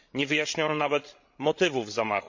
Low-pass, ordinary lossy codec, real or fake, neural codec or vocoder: 7.2 kHz; MP3, 48 kbps; fake; codec, 16 kHz, 16 kbps, FreqCodec, larger model